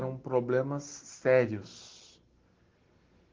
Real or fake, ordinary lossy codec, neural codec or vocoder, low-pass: real; Opus, 16 kbps; none; 7.2 kHz